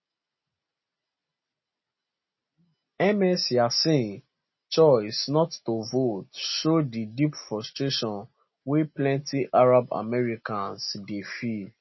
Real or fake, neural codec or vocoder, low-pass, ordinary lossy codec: real; none; 7.2 kHz; MP3, 24 kbps